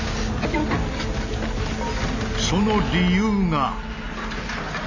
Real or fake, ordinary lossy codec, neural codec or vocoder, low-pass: real; none; none; 7.2 kHz